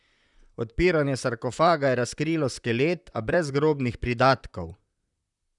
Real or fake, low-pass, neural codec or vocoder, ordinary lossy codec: fake; 10.8 kHz; vocoder, 44.1 kHz, 128 mel bands, Pupu-Vocoder; none